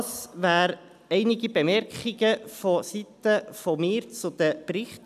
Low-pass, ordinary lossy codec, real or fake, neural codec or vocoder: 14.4 kHz; none; real; none